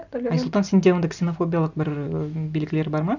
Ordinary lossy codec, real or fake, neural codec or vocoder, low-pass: none; real; none; 7.2 kHz